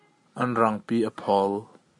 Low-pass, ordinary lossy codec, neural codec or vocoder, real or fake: 10.8 kHz; MP3, 48 kbps; none; real